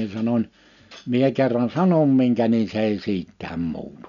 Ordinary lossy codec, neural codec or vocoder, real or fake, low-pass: MP3, 96 kbps; none; real; 7.2 kHz